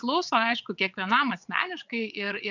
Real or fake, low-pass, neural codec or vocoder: fake; 7.2 kHz; vocoder, 22.05 kHz, 80 mel bands, WaveNeXt